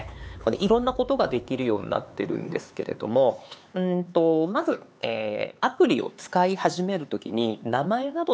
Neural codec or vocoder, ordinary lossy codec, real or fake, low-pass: codec, 16 kHz, 4 kbps, X-Codec, HuBERT features, trained on LibriSpeech; none; fake; none